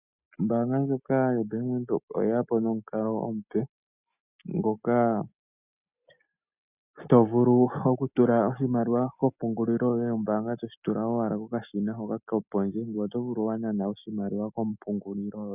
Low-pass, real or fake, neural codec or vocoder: 3.6 kHz; real; none